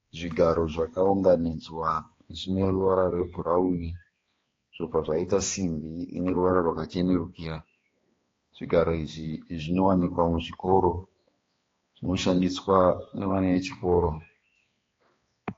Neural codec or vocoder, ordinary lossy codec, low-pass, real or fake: codec, 16 kHz, 2 kbps, X-Codec, HuBERT features, trained on balanced general audio; AAC, 32 kbps; 7.2 kHz; fake